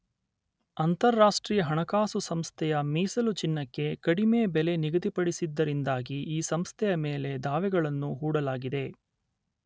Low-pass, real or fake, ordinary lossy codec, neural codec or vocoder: none; real; none; none